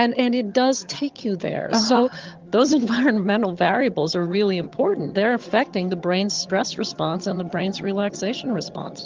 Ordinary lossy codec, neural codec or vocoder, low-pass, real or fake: Opus, 24 kbps; vocoder, 22.05 kHz, 80 mel bands, HiFi-GAN; 7.2 kHz; fake